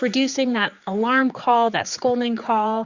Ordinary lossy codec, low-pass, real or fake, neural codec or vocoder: Opus, 64 kbps; 7.2 kHz; fake; codec, 16 kHz, 4 kbps, X-Codec, HuBERT features, trained on general audio